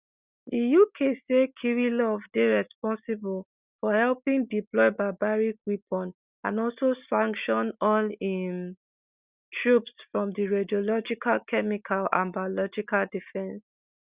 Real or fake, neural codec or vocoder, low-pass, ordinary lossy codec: real; none; 3.6 kHz; Opus, 64 kbps